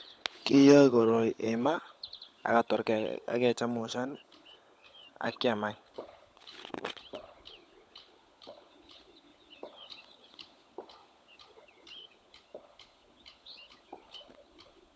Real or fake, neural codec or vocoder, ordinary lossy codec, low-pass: fake; codec, 16 kHz, 16 kbps, FunCodec, trained on LibriTTS, 50 frames a second; none; none